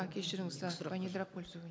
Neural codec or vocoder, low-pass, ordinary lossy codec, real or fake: none; none; none; real